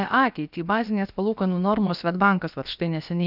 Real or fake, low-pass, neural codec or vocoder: fake; 5.4 kHz; codec, 16 kHz, about 1 kbps, DyCAST, with the encoder's durations